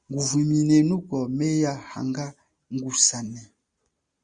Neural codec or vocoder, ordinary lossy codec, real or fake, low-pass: none; Opus, 64 kbps; real; 9.9 kHz